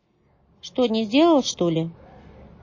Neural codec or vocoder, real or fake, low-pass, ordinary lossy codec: none; real; 7.2 kHz; MP3, 32 kbps